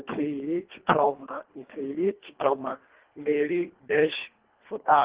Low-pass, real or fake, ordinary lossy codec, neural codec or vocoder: 3.6 kHz; fake; Opus, 16 kbps; codec, 24 kHz, 1.5 kbps, HILCodec